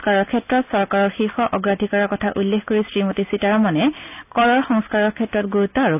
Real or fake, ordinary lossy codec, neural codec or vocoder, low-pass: real; none; none; 3.6 kHz